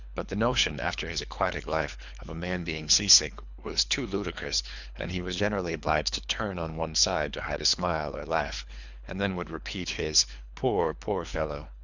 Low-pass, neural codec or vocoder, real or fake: 7.2 kHz; codec, 24 kHz, 3 kbps, HILCodec; fake